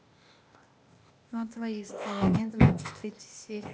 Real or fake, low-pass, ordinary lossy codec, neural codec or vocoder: fake; none; none; codec, 16 kHz, 0.8 kbps, ZipCodec